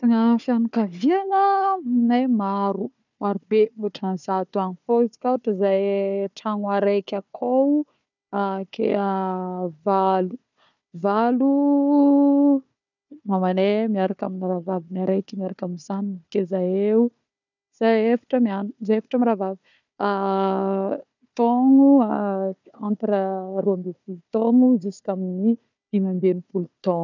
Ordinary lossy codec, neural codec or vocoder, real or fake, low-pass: none; codec, 16 kHz, 4 kbps, FunCodec, trained on Chinese and English, 50 frames a second; fake; 7.2 kHz